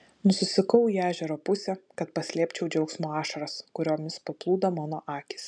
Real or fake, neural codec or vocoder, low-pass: real; none; 9.9 kHz